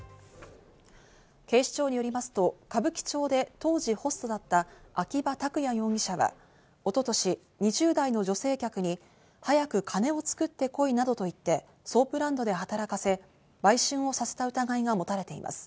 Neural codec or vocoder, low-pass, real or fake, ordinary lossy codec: none; none; real; none